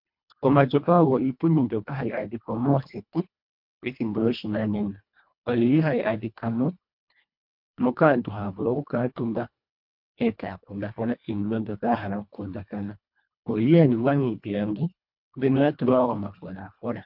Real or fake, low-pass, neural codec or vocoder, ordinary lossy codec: fake; 5.4 kHz; codec, 24 kHz, 1.5 kbps, HILCodec; MP3, 48 kbps